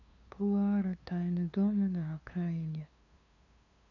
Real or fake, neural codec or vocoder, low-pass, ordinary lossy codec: fake; codec, 16 kHz, 2 kbps, FunCodec, trained on LibriTTS, 25 frames a second; 7.2 kHz; none